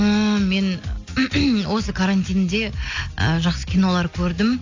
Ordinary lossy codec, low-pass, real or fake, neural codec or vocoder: AAC, 48 kbps; 7.2 kHz; real; none